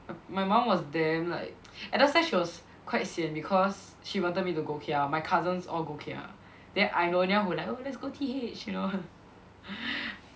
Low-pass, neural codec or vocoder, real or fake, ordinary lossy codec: none; none; real; none